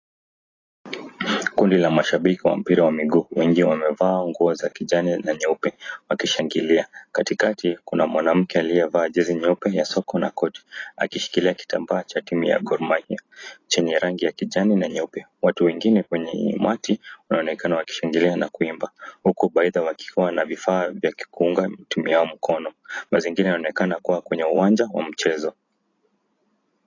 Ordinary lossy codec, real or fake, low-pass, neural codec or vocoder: AAC, 32 kbps; real; 7.2 kHz; none